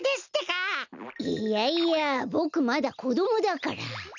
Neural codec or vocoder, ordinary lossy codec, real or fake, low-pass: none; none; real; 7.2 kHz